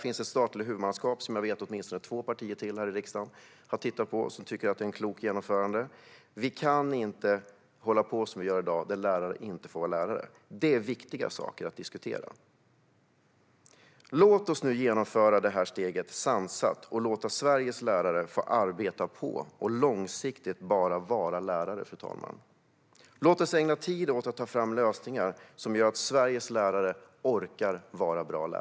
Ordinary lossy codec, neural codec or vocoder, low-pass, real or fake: none; none; none; real